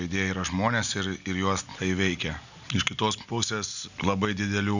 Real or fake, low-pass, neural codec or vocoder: real; 7.2 kHz; none